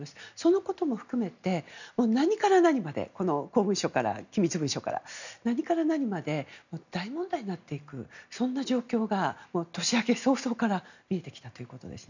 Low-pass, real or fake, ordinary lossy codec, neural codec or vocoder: 7.2 kHz; real; none; none